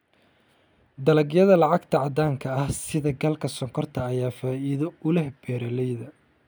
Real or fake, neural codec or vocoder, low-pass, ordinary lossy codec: real; none; none; none